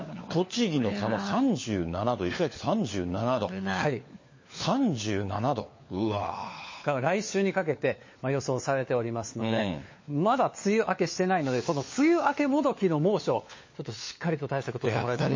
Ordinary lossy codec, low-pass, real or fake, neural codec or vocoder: MP3, 32 kbps; 7.2 kHz; fake; codec, 16 kHz, 4 kbps, FunCodec, trained on LibriTTS, 50 frames a second